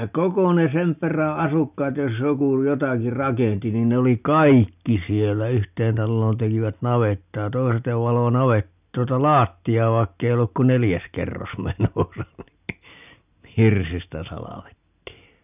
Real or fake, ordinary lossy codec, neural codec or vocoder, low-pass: real; none; none; 3.6 kHz